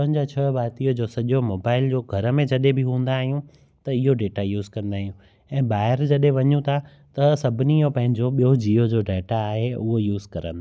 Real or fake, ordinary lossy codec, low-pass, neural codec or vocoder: real; none; none; none